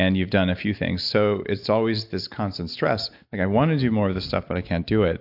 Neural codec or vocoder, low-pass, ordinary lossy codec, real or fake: none; 5.4 kHz; AAC, 48 kbps; real